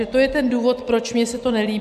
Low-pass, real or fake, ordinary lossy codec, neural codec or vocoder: 14.4 kHz; real; AAC, 96 kbps; none